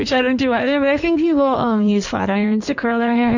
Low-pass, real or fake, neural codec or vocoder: 7.2 kHz; fake; codec, 16 kHz in and 24 kHz out, 1.1 kbps, FireRedTTS-2 codec